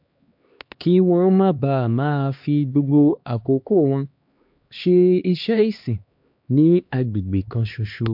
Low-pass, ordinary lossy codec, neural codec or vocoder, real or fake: 5.4 kHz; MP3, 48 kbps; codec, 16 kHz, 2 kbps, X-Codec, HuBERT features, trained on LibriSpeech; fake